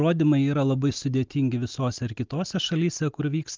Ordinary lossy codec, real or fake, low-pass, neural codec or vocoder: Opus, 24 kbps; real; 7.2 kHz; none